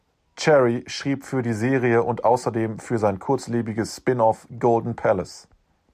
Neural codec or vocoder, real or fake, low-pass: none; real; 14.4 kHz